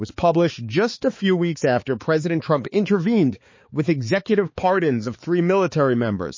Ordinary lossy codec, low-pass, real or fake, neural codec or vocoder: MP3, 32 kbps; 7.2 kHz; fake; codec, 16 kHz, 4 kbps, X-Codec, HuBERT features, trained on balanced general audio